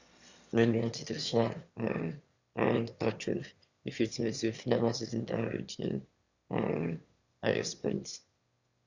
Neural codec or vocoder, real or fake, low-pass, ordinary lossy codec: autoencoder, 22.05 kHz, a latent of 192 numbers a frame, VITS, trained on one speaker; fake; 7.2 kHz; Opus, 64 kbps